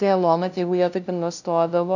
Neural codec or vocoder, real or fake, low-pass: codec, 16 kHz, 0.5 kbps, FunCodec, trained on LibriTTS, 25 frames a second; fake; 7.2 kHz